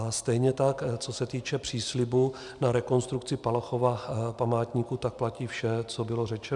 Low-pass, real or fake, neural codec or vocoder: 10.8 kHz; real; none